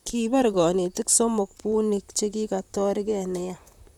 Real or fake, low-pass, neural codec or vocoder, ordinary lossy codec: fake; 19.8 kHz; vocoder, 44.1 kHz, 128 mel bands, Pupu-Vocoder; none